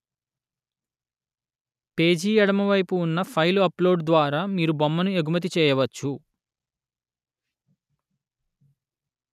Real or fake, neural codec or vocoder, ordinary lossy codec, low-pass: real; none; none; 14.4 kHz